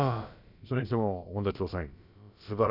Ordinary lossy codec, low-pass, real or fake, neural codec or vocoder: none; 5.4 kHz; fake; codec, 16 kHz, about 1 kbps, DyCAST, with the encoder's durations